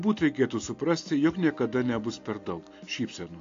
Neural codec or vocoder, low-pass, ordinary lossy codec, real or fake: none; 7.2 kHz; AAC, 48 kbps; real